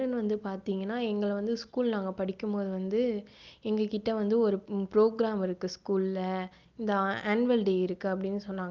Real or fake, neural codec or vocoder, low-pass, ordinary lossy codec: real; none; 7.2 kHz; Opus, 24 kbps